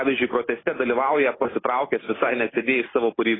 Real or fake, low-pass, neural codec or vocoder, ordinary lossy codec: real; 7.2 kHz; none; AAC, 16 kbps